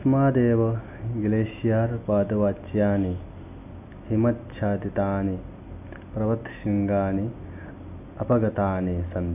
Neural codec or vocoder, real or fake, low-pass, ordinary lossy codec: none; real; 3.6 kHz; none